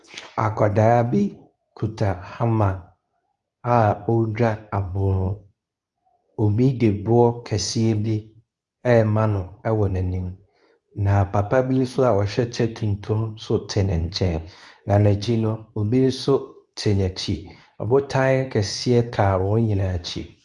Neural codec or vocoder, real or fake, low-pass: codec, 24 kHz, 0.9 kbps, WavTokenizer, medium speech release version 2; fake; 10.8 kHz